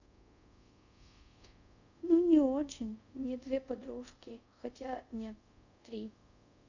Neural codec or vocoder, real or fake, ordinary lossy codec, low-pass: codec, 24 kHz, 0.5 kbps, DualCodec; fake; none; 7.2 kHz